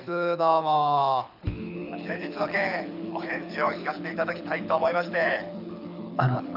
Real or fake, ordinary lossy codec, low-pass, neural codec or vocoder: fake; none; 5.4 kHz; codec, 24 kHz, 6 kbps, HILCodec